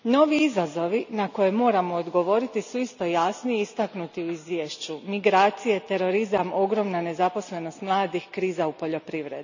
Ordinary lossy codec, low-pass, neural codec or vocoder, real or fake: none; 7.2 kHz; none; real